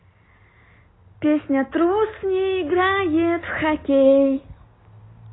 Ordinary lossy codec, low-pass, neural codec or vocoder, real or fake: AAC, 16 kbps; 7.2 kHz; none; real